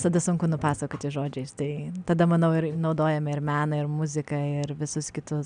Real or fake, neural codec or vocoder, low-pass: real; none; 9.9 kHz